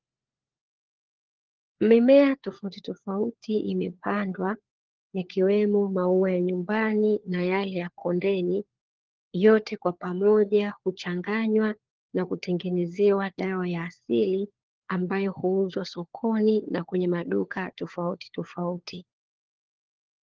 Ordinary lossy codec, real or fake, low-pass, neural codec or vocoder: Opus, 16 kbps; fake; 7.2 kHz; codec, 16 kHz, 4 kbps, FunCodec, trained on LibriTTS, 50 frames a second